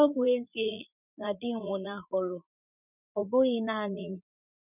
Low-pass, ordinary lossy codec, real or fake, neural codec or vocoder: 3.6 kHz; none; fake; vocoder, 44.1 kHz, 80 mel bands, Vocos